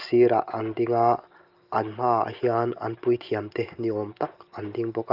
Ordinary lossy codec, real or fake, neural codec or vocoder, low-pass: Opus, 32 kbps; real; none; 5.4 kHz